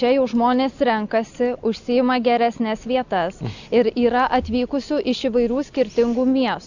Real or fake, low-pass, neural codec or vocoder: real; 7.2 kHz; none